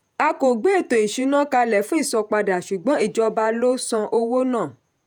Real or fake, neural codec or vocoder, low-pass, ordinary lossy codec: fake; vocoder, 48 kHz, 128 mel bands, Vocos; none; none